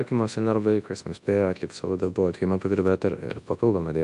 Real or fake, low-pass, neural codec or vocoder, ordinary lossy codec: fake; 10.8 kHz; codec, 24 kHz, 0.9 kbps, WavTokenizer, large speech release; AAC, 64 kbps